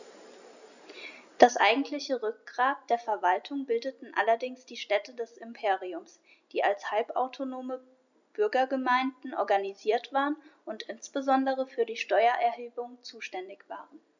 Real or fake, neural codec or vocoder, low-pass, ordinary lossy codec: real; none; 7.2 kHz; none